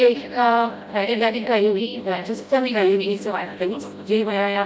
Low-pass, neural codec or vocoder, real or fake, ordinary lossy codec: none; codec, 16 kHz, 0.5 kbps, FreqCodec, smaller model; fake; none